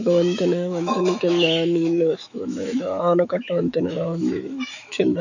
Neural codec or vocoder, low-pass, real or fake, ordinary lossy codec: autoencoder, 48 kHz, 128 numbers a frame, DAC-VAE, trained on Japanese speech; 7.2 kHz; fake; none